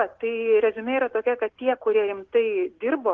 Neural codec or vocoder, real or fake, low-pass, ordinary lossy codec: none; real; 7.2 kHz; Opus, 16 kbps